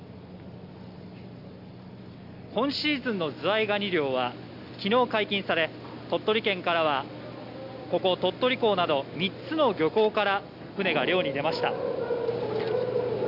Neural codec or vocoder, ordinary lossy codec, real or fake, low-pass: none; none; real; 5.4 kHz